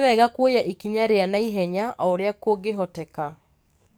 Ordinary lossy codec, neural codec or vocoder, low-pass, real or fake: none; codec, 44.1 kHz, 7.8 kbps, DAC; none; fake